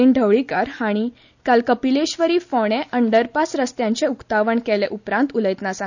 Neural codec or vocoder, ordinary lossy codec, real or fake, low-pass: none; none; real; 7.2 kHz